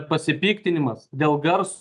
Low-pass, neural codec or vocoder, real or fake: 10.8 kHz; none; real